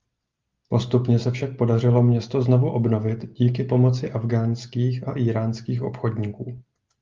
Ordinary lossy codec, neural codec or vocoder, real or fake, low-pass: Opus, 32 kbps; none; real; 7.2 kHz